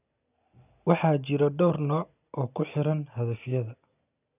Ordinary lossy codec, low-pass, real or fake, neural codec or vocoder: none; 3.6 kHz; real; none